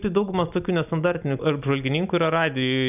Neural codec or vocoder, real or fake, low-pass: none; real; 3.6 kHz